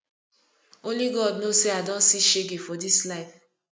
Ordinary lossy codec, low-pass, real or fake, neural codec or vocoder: none; none; real; none